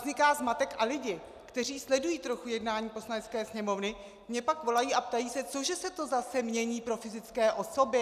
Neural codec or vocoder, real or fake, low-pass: none; real; 14.4 kHz